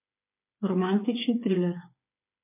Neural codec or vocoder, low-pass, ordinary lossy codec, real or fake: codec, 16 kHz, 8 kbps, FreqCodec, smaller model; 3.6 kHz; MP3, 24 kbps; fake